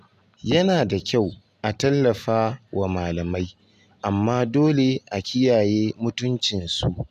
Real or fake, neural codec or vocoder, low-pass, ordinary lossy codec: real; none; 14.4 kHz; none